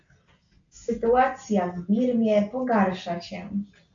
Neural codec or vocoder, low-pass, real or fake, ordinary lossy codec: none; 7.2 kHz; real; MP3, 64 kbps